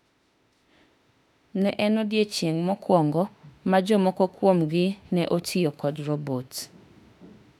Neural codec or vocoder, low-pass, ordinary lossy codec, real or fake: autoencoder, 48 kHz, 32 numbers a frame, DAC-VAE, trained on Japanese speech; 19.8 kHz; none; fake